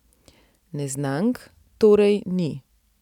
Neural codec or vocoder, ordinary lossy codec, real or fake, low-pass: none; none; real; 19.8 kHz